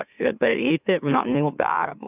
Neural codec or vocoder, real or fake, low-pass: autoencoder, 44.1 kHz, a latent of 192 numbers a frame, MeloTTS; fake; 3.6 kHz